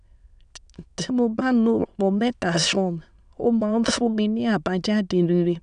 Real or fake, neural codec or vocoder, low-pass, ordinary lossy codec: fake; autoencoder, 22.05 kHz, a latent of 192 numbers a frame, VITS, trained on many speakers; 9.9 kHz; none